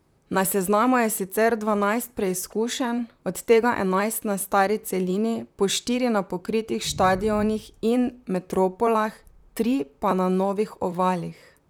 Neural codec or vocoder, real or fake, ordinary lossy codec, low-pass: vocoder, 44.1 kHz, 128 mel bands, Pupu-Vocoder; fake; none; none